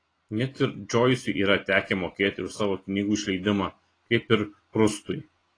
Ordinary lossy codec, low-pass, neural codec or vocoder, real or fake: AAC, 32 kbps; 9.9 kHz; none; real